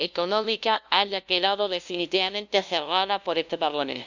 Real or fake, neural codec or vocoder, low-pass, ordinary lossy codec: fake; codec, 16 kHz, 0.5 kbps, FunCodec, trained on LibriTTS, 25 frames a second; 7.2 kHz; none